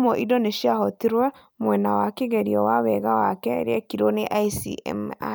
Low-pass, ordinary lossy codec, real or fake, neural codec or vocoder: none; none; real; none